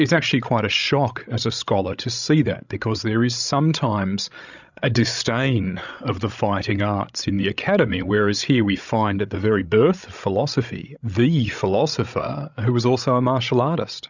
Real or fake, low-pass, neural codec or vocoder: fake; 7.2 kHz; codec, 16 kHz, 16 kbps, FreqCodec, larger model